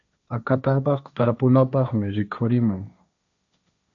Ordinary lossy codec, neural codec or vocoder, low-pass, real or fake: MP3, 96 kbps; codec, 16 kHz, 1.1 kbps, Voila-Tokenizer; 7.2 kHz; fake